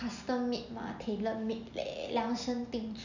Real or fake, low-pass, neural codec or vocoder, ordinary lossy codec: real; 7.2 kHz; none; none